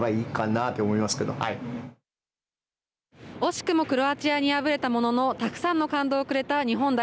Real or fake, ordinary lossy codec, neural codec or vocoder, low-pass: real; none; none; none